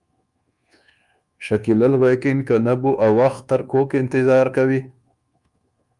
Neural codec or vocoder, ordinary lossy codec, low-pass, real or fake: codec, 24 kHz, 1.2 kbps, DualCodec; Opus, 32 kbps; 10.8 kHz; fake